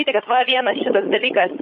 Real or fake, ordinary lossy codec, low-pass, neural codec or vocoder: fake; MP3, 32 kbps; 7.2 kHz; codec, 16 kHz, 16 kbps, FunCodec, trained on LibriTTS, 50 frames a second